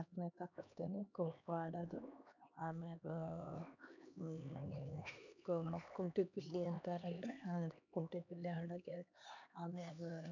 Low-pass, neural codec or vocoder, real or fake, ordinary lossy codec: 7.2 kHz; codec, 16 kHz, 2 kbps, X-Codec, HuBERT features, trained on LibriSpeech; fake; none